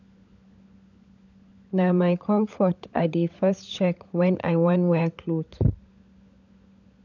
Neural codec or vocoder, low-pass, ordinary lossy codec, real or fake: codec, 16 kHz, 16 kbps, FunCodec, trained on LibriTTS, 50 frames a second; 7.2 kHz; none; fake